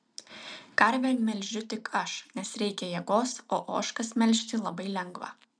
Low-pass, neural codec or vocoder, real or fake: 9.9 kHz; none; real